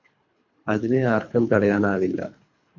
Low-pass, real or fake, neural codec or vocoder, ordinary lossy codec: 7.2 kHz; fake; codec, 24 kHz, 3 kbps, HILCodec; MP3, 48 kbps